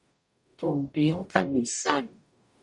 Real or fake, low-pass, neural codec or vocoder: fake; 10.8 kHz; codec, 44.1 kHz, 0.9 kbps, DAC